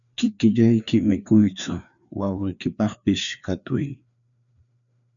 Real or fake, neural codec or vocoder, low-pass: fake; codec, 16 kHz, 2 kbps, FreqCodec, larger model; 7.2 kHz